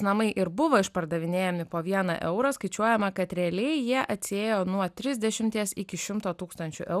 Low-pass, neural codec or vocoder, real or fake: 14.4 kHz; none; real